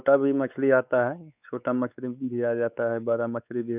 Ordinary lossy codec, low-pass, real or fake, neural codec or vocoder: none; 3.6 kHz; fake; codec, 16 kHz, 4 kbps, X-Codec, WavLM features, trained on Multilingual LibriSpeech